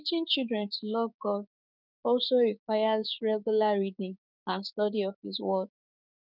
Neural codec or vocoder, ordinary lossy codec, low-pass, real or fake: codec, 16 kHz, 6 kbps, DAC; none; 5.4 kHz; fake